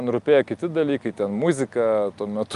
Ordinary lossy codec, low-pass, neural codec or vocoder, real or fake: Opus, 32 kbps; 10.8 kHz; none; real